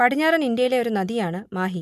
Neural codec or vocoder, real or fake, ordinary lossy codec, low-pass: none; real; none; 14.4 kHz